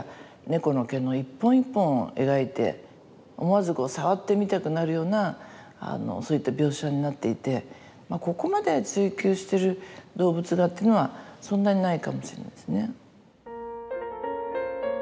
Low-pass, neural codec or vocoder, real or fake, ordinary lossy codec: none; none; real; none